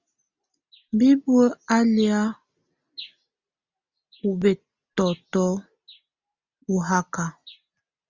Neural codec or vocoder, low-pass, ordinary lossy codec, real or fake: none; 7.2 kHz; Opus, 64 kbps; real